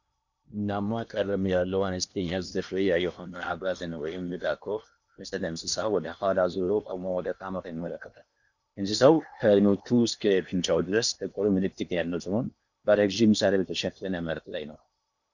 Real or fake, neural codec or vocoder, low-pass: fake; codec, 16 kHz in and 24 kHz out, 0.8 kbps, FocalCodec, streaming, 65536 codes; 7.2 kHz